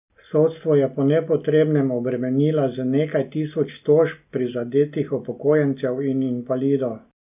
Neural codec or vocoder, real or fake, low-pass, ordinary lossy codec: none; real; 3.6 kHz; none